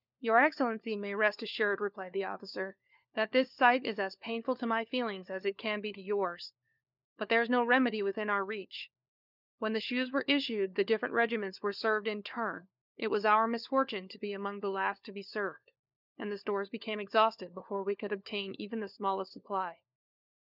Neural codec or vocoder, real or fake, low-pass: codec, 16 kHz, 4 kbps, FunCodec, trained on LibriTTS, 50 frames a second; fake; 5.4 kHz